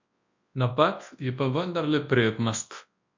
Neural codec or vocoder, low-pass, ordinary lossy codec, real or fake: codec, 24 kHz, 0.9 kbps, WavTokenizer, large speech release; 7.2 kHz; MP3, 48 kbps; fake